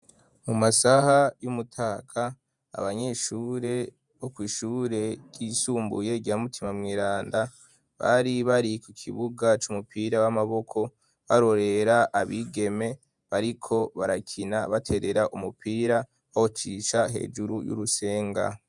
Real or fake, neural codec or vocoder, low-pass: fake; vocoder, 48 kHz, 128 mel bands, Vocos; 10.8 kHz